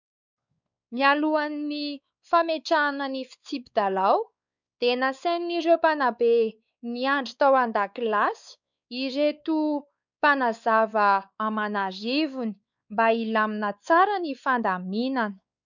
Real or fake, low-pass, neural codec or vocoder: fake; 7.2 kHz; codec, 16 kHz, 4 kbps, X-Codec, WavLM features, trained on Multilingual LibriSpeech